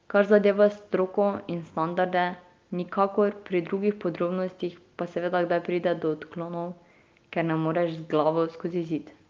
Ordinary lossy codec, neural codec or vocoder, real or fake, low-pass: Opus, 24 kbps; none; real; 7.2 kHz